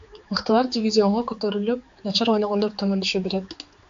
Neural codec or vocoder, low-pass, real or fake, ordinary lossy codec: codec, 16 kHz, 4 kbps, X-Codec, HuBERT features, trained on general audio; 7.2 kHz; fake; AAC, 64 kbps